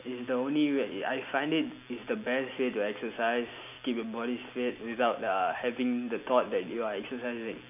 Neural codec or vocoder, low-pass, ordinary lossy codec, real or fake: none; 3.6 kHz; none; real